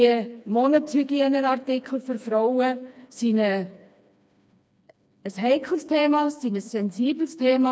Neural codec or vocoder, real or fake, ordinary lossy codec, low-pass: codec, 16 kHz, 2 kbps, FreqCodec, smaller model; fake; none; none